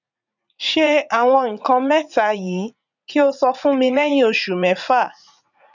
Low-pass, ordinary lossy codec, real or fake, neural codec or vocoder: 7.2 kHz; none; fake; vocoder, 44.1 kHz, 80 mel bands, Vocos